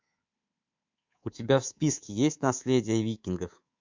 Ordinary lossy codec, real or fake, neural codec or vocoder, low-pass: MP3, 64 kbps; fake; codec, 24 kHz, 3.1 kbps, DualCodec; 7.2 kHz